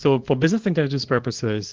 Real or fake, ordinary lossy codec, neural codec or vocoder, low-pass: fake; Opus, 16 kbps; codec, 24 kHz, 0.9 kbps, WavTokenizer, small release; 7.2 kHz